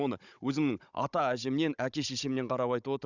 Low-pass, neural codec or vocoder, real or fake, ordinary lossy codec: 7.2 kHz; codec, 16 kHz, 16 kbps, FunCodec, trained on Chinese and English, 50 frames a second; fake; none